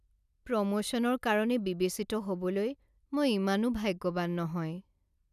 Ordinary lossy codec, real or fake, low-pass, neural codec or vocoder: none; real; 14.4 kHz; none